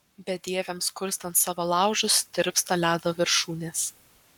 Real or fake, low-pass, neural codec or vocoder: fake; 19.8 kHz; codec, 44.1 kHz, 7.8 kbps, Pupu-Codec